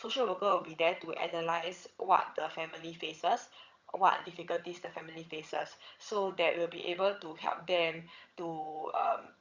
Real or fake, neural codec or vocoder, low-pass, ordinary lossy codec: fake; vocoder, 22.05 kHz, 80 mel bands, HiFi-GAN; 7.2 kHz; Opus, 64 kbps